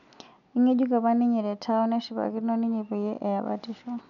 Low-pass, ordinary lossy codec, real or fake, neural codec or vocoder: 7.2 kHz; none; real; none